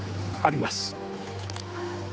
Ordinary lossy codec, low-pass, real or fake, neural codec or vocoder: none; none; fake; codec, 16 kHz, 4 kbps, X-Codec, HuBERT features, trained on general audio